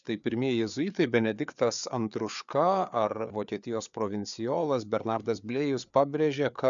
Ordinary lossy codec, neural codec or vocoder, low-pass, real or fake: MP3, 96 kbps; codec, 16 kHz, 4 kbps, FreqCodec, larger model; 7.2 kHz; fake